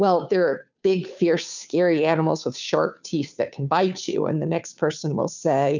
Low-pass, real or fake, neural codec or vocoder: 7.2 kHz; fake; codec, 16 kHz, 2 kbps, FunCodec, trained on Chinese and English, 25 frames a second